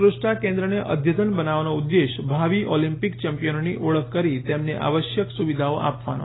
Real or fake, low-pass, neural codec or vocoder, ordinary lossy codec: real; 7.2 kHz; none; AAC, 16 kbps